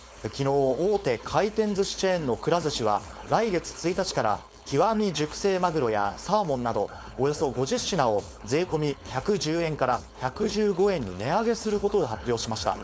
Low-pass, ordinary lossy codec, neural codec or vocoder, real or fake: none; none; codec, 16 kHz, 4.8 kbps, FACodec; fake